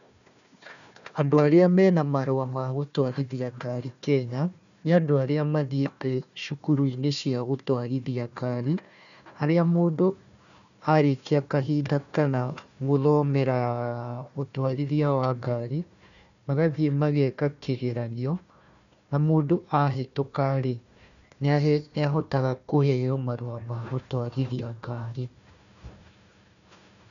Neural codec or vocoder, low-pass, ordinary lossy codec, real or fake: codec, 16 kHz, 1 kbps, FunCodec, trained on Chinese and English, 50 frames a second; 7.2 kHz; none; fake